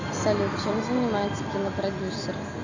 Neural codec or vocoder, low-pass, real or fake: none; 7.2 kHz; real